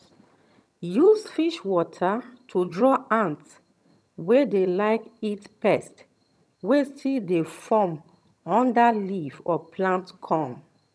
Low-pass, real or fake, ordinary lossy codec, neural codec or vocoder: none; fake; none; vocoder, 22.05 kHz, 80 mel bands, HiFi-GAN